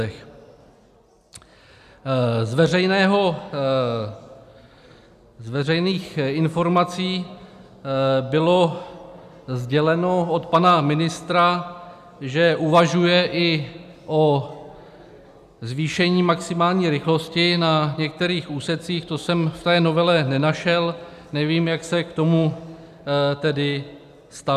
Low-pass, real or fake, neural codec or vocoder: 14.4 kHz; real; none